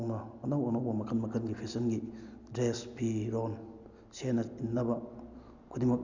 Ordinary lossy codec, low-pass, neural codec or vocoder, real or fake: Opus, 64 kbps; 7.2 kHz; none; real